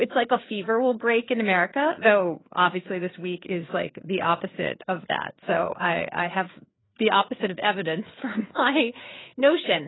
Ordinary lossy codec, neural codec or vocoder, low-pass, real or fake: AAC, 16 kbps; codec, 16 kHz, 4 kbps, FreqCodec, larger model; 7.2 kHz; fake